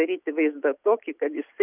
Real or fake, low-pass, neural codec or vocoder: real; 3.6 kHz; none